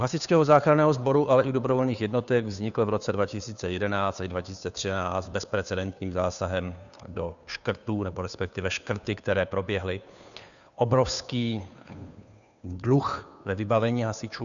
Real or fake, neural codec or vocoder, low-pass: fake; codec, 16 kHz, 2 kbps, FunCodec, trained on Chinese and English, 25 frames a second; 7.2 kHz